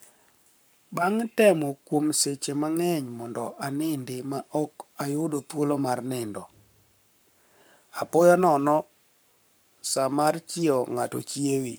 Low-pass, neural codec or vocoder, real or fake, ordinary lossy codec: none; codec, 44.1 kHz, 7.8 kbps, Pupu-Codec; fake; none